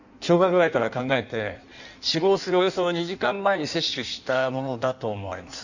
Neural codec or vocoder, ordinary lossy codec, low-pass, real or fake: codec, 16 kHz in and 24 kHz out, 1.1 kbps, FireRedTTS-2 codec; none; 7.2 kHz; fake